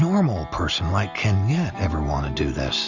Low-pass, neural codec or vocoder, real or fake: 7.2 kHz; none; real